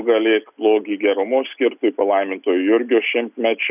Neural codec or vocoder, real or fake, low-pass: none; real; 3.6 kHz